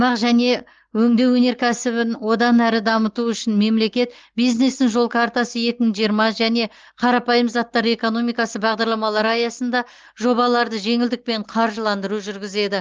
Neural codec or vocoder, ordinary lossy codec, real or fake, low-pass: none; Opus, 16 kbps; real; 7.2 kHz